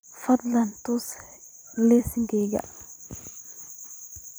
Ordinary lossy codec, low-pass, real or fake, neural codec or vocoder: none; none; fake; vocoder, 44.1 kHz, 128 mel bands every 256 samples, BigVGAN v2